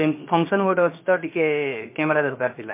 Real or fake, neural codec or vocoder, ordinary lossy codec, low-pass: fake; codec, 16 kHz, 0.7 kbps, FocalCodec; MP3, 24 kbps; 3.6 kHz